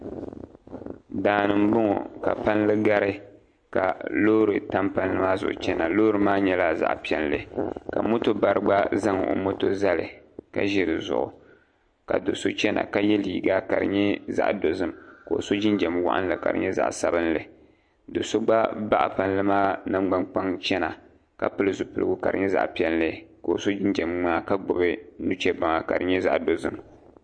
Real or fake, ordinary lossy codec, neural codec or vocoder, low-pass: real; MP3, 48 kbps; none; 9.9 kHz